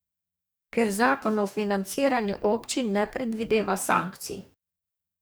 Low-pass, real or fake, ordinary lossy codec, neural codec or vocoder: none; fake; none; codec, 44.1 kHz, 2.6 kbps, DAC